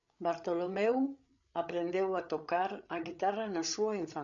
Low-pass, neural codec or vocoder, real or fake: 7.2 kHz; codec, 16 kHz, 8 kbps, FreqCodec, larger model; fake